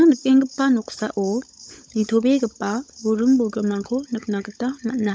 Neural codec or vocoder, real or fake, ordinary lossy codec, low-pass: codec, 16 kHz, 16 kbps, FunCodec, trained on LibriTTS, 50 frames a second; fake; none; none